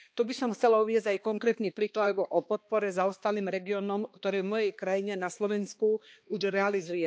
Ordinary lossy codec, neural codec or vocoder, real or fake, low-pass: none; codec, 16 kHz, 2 kbps, X-Codec, HuBERT features, trained on balanced general audio; fake; none